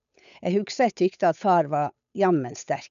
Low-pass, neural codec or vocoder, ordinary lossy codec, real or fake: 7.2 kHz; codec, 16 kHz, 8 kbps, FunCodec, trained on Chinese and English, 25 frames a second; none; fake